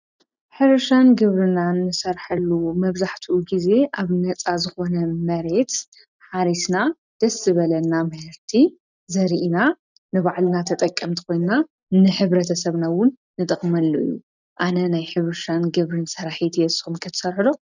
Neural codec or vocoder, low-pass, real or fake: none; 7.2 kHz; real